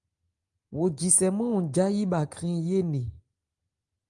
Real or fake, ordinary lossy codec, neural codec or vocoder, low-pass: real; Opus, 32 kbps; none; 10.8 kHz